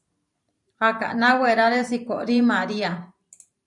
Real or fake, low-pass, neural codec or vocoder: fake; 10.8 kHz; vocoder, 44.1 kHz, 128 mel bands every 512 samples, BigVGAN v2